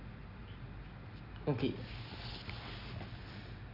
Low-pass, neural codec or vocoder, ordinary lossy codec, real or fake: 5.4 kHz; none; none; real